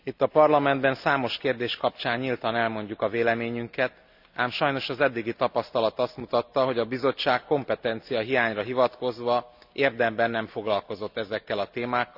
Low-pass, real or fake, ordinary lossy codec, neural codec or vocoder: 5.4 kHz; real; none; none